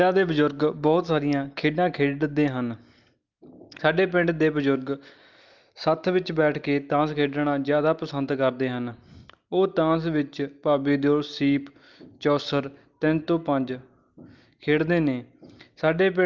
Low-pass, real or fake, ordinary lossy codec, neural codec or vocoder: 7.2 kHz; real; Opus, 32 kbps; none